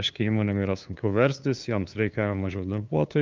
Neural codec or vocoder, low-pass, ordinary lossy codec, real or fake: codec, 16 kHz, 2 kbps, FunCodec, trained on LibriTTS, 25 frames a second; 7.2 kHz; Opus, 24 kbps; fake